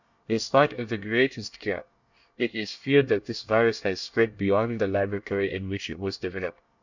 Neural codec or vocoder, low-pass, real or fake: codec, 24 kHz, 1 kbps, SNAC; 7.2 kHz; fake